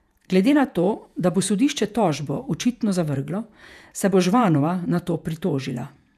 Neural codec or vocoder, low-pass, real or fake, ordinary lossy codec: vocoder, 48 kHz, 128 mel bands, Vocos; 14.4 kHz; fake; none